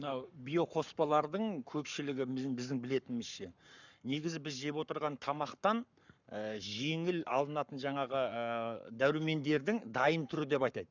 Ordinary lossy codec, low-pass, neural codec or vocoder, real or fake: none; 7.2 kHz; codec, 44.1 kHz, 7.8 kbps, Pupu-Codec; fake